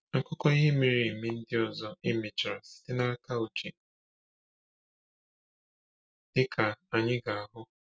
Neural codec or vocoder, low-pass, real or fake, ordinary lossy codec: none; none; real; none